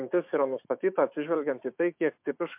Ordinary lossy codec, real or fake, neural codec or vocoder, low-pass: MP3, 32 kbps; fake; autoencoder, 48 kHz, 128 numbers a frame, DAC-VAE, trained on Japanese speech; 3.6 kHz